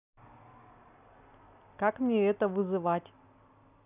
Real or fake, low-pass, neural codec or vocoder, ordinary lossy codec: real; 3.6 kHz; none; none